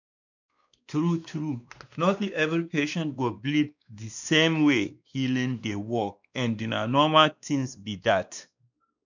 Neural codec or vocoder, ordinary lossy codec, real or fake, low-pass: codec, 16 kHz, 2 kbps, X-Codec, WavLM features, trained on Multilingual LibriSpeech; none; fake; 7.2 kHz